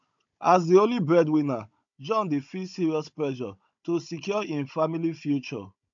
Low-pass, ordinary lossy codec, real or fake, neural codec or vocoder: 7.2 kHz; AAC, 64 kbps; fake; codec, 16 kHz, 16 kbps, FunCodec, trained on Chinese and English, 50 frames a second